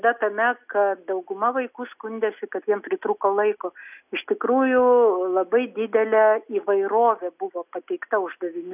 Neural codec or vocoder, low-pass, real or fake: none; 3.6 kHz; real